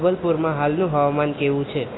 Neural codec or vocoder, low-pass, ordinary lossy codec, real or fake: none; 7.2 kHz; AAC, 16 kbps; real